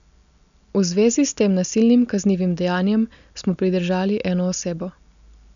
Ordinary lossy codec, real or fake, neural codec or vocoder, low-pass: none; real; none; 7.2 kHz